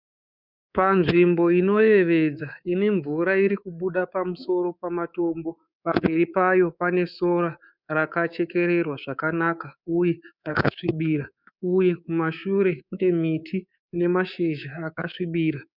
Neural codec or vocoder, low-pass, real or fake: codec, 24 kHz, 3.1 kbps, DualCodec; 5.4 kHz; fake